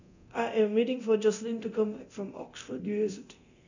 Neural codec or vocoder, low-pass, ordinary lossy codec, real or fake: codec, 24 kHz, 0.9 kbps, DualCodec; 7.2 kHz; none; fake